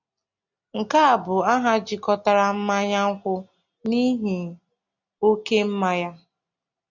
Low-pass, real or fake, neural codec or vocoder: 7.2 kHz; real; none